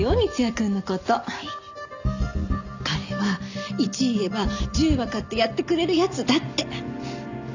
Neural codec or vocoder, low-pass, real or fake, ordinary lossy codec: none; 7.2 kHz; real; none